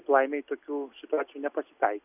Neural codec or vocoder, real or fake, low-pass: none; real; 3.6 kHz